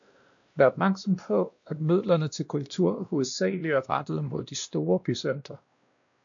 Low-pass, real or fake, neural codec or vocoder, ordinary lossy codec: 7.2 kHz; fake; codec, 16 kHz, 1 kbps, X-Codec, WavLM features, trained on Multilingual LibriSpeech; AAC, 64 kbps